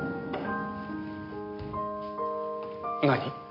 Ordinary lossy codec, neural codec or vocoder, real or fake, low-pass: MP3, 32 kbps; none; real; 5.4 kHz